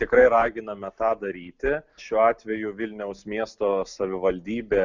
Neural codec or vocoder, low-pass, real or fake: none; 7.2 kHz; real